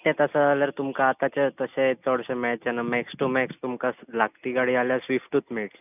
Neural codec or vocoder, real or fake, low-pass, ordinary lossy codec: none; real; 3.6 kHz; none